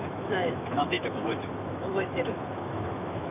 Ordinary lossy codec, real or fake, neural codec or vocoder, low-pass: none; fake; autoencoder, 48 kHz, 128 numbers a frame, DAC-VAE, trained on Japanese speech; 3.6 kHz